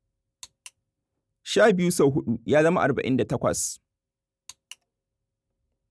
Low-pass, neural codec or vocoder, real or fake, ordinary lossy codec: none; none; real; none